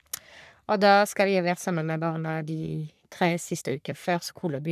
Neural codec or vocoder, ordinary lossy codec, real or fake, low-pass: codec, 44.1 kHz, 3.4 kbps, Pupu-Codec; none; fake; 14.4 kHz